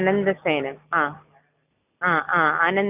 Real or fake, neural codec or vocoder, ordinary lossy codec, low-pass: real; none; none; 3.6 kHz